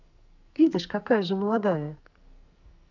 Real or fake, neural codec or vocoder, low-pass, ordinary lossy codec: fake; codec, 44.1 kHz, 2.6 kbps, SNAC; 7.2 kHz; none